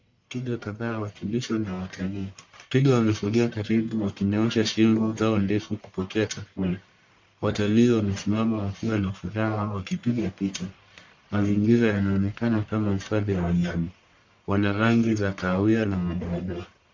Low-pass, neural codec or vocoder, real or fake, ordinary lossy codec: 7.2 kHz; codec, 44.1 kHz, 1.7 kbps, Pupu-Codec; fake; MP3, 64 kbps